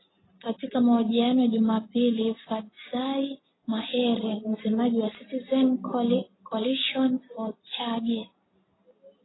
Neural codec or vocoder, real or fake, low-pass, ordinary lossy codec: none; real; 7.2 kHz; AAC, 16 kbps